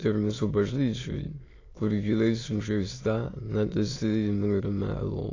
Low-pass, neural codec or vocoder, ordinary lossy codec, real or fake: 7.2 kHz; autoencoder, 22.05 kHz, a latent of 192 numbers a frame, VITS, trained on many speakers; AAC, 32 kbps; fake